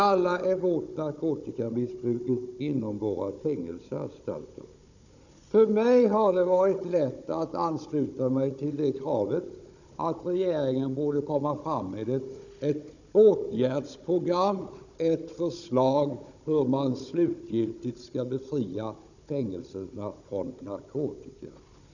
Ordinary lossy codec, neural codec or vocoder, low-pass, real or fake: none; codec, 16 kHz, 16 kbps, FunCodec, trained on Chinese and English, 50 frames a second; 7.2 kHz; fake